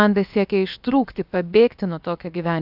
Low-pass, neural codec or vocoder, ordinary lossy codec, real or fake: 5.4 kHz; codec, 16 kHz, about 1 kbps, DyCAST, with the encoder's durations; AAC, 48 kbps; fake